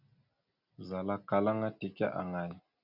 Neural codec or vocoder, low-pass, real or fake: none; 5.4 kHz; real